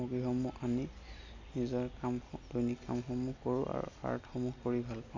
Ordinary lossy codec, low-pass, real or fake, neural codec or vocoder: MP3, 64 kbps; 7.2 kHz; real; none